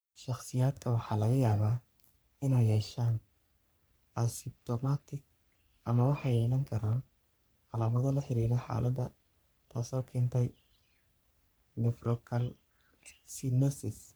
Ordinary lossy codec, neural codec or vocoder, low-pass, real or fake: none; codec, 44.1 kHz, 3.4 kbps, Pupu-Codec; none; fake